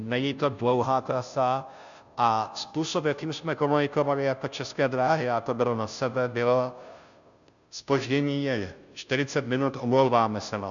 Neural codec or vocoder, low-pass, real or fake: codec, 16 kHz, 0.5 kbps, FunCodec, trained on Chinese and English, 25 frames a second; 7.2 kHz; fake